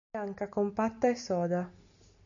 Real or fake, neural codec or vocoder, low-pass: real; none; 7.2 kHz